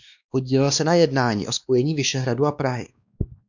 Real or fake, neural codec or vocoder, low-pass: fake; codec, 16 kHz, 2 kbps, X-Codec, WavLM features, trained on Multilingual LibriSpeech; 7.2 kHz